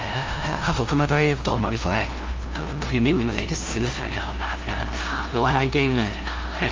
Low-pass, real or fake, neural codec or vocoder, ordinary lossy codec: 7.2 kHz; fake; codec, 16 kHz, 0.5 kbps, FunCodec, trained on LibriTTS, 25 frames a second; Opus, 32 kbps